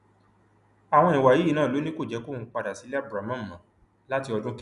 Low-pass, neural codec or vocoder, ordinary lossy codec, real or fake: 10.8 kHz; none; none; real